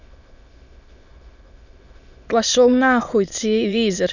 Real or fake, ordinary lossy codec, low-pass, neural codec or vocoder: fake; none; 7.2 kHz; autoencoder, 22.05 kHz, a latent of 192 numbers a frame, VITS, trained on many speakers